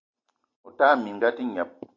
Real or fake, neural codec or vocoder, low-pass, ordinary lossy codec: real; none; 7.2 kHz; AAC, 48 kbps